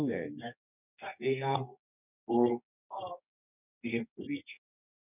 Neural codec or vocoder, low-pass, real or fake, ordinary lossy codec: codec, 24 kHz, 0.9 kbps, WavTokenizer, medium music audio release; 3.6 kHz; fake; none